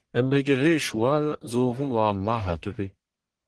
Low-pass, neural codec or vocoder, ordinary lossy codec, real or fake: 10.8 kHz; codec, 44.1 kHz, 1.7 kbps, Pupu-Codec; Opus, 16 kbps; fake